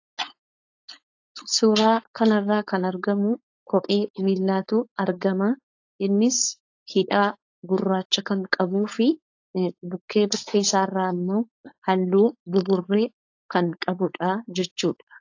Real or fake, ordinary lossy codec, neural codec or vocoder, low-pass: fake; AAC, 48 kbps; codec, 16 kHz, 4.8 kbps, FACodec; 7.2 kHz